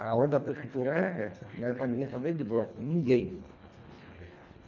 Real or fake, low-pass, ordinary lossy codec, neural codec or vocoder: fake; 7.2 kHz; none; codec, 24 kHz, 1.5 kbps, HILCodec